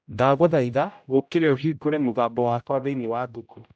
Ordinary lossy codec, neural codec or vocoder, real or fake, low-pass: none; codec, 16 kHz, 0.5 kbps, X-Codec, HuBERT features, trained on general audio; fake; none